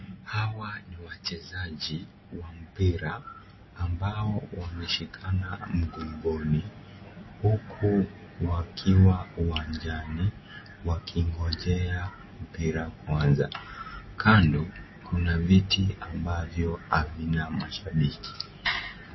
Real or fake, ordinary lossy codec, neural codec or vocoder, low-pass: real; MP3, 24 kbps; none; 7.2 kHz